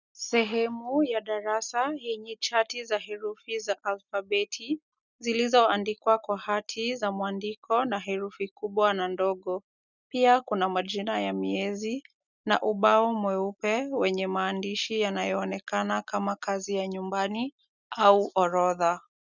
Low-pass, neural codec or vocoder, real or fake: 7.2 kHz; none; real